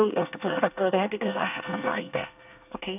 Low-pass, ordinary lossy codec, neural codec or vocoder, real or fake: 3.6 kHz; none; codec, 24 kHz, 1 kbps, SNAC; fake